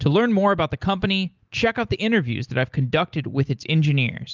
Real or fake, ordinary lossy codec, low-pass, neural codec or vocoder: real; Opus, 24 kbps; 7.2 kHz; none